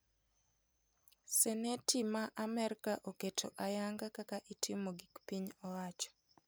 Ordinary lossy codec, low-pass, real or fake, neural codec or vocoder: none; none; real; none